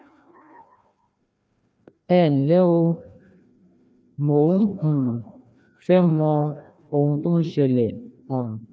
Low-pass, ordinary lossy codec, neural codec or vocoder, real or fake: none; none; codec, 16 kHz, 1 kbps, FreqCodec, larger model; fake